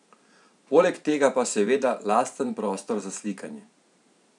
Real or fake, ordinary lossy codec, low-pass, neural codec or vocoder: fake; none; 10.8 kHz; vocoder, 44.1 kHz, 128 mel bands every 512 samples, BigVGAN v2